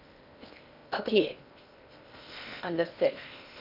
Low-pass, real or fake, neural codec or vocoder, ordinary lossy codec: 5.4 kHz; fake; codec, 16 kHz in and 24 kHz out, 0.8 kbps, FocalCodec, streaming, 65536 codes; none